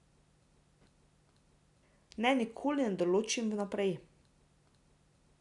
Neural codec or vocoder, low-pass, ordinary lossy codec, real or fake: none; 10.8 kHz; none; real